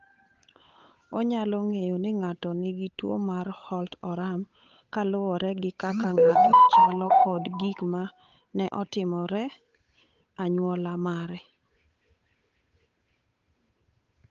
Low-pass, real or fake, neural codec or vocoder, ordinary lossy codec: 7.2 kHz; fake; codec, 16 kHz, 8 kbps, FunCodec, trained on Chinese and English, 25 frames a second; Opus, 24 kbps